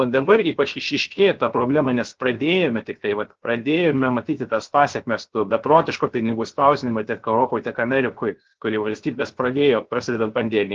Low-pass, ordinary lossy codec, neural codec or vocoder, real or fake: 7.2 kHz; Opus, 16 kbps; codec, 16 kHz, 0.7 kbps, FocalCodec; fake